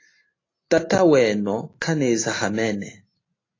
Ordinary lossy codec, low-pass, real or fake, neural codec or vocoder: AAC, 32 kbps; 7.2 kHz; real; none